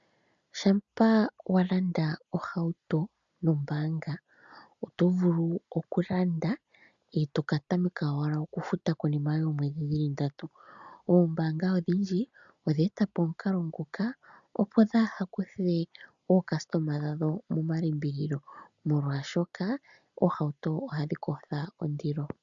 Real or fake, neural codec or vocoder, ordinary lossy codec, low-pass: real; none; MP3, 96 kbps; 7.2 kHz